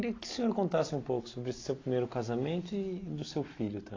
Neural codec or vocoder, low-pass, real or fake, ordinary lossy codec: none; 7.2 kHz; real; AAC, 32 kbps